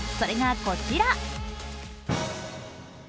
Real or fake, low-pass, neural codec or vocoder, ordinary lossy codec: real; none; none; none